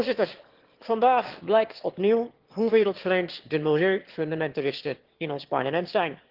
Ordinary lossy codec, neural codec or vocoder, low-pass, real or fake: Opus, 16 kbps; autoencoder, 22.05 kHz, a latent of 192 numbers a frame, VITS, trained on one speaker; 5.4 kHz; fake